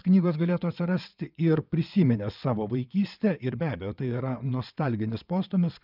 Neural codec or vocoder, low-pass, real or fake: vocoder, 22.05 kHz, 80 mel bands, WaveNeXt; 5.4 kHz; fake